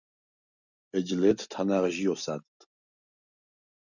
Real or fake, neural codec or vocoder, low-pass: real; none; 7.2 kHz